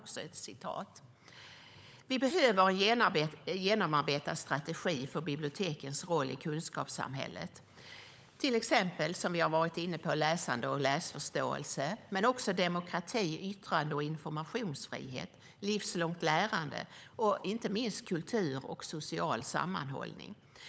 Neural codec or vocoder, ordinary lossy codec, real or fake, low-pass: codec, 16 kHz, 16 kbps, FunCodec, trained on LibriTTS, 50 frames a second; none; fake; none